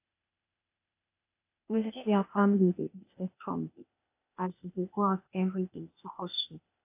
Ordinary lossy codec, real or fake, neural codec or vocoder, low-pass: AAC, 32 kbps; fake; codec, 16 kHz, 0.8 kbps, ZipCodec; 3.6 kHz